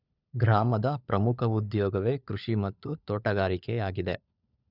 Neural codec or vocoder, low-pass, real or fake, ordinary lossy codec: codec, 16 kHz, 16 kbps, FunCodec, trained on LibriTTS, 50 frames a second; 5.4 kHz; fake; none